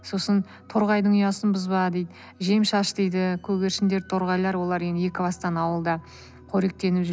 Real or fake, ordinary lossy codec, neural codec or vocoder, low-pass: real; none; none; none